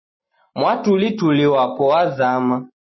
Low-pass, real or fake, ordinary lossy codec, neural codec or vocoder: 7.2 kHz; real; MP3, 24 kbps; none